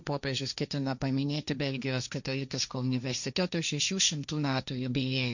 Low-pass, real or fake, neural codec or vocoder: 7.2 kHz; fake; codec, 16 kHz, 1.1 kbps, Voila-Tokenizer